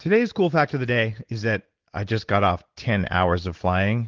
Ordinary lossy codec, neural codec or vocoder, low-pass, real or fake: Opus, 16 kbps; none; 7.2 kHz; real